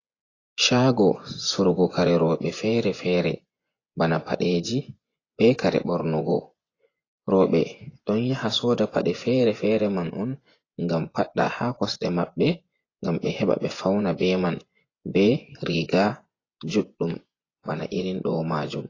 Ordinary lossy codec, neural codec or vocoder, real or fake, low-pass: AAC, 32 kbps; vocoder, 24 kHz, 100 mel bands, Vocos; fake; 7.2 kHz